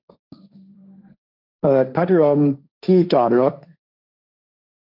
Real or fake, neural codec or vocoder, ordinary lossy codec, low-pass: fake; codec, 16 kHz, 1.1 kbps, Voila-Tokenizer; none; 5.4 kHz